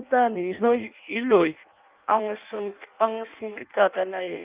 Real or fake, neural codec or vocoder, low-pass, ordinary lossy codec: fake; codec, 16 kHz in and 24 kHz out, 0.6 kbps, FireRedTTS-2 codec; 3.6 kHz; Opus, 32 kbps